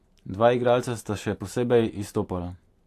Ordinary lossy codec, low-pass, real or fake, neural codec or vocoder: AAC, 64 kbps; 14.4 kHz; fake; vocoder, 44.1 kHz, 128 mel bands every 512 samples, BigVGAN v2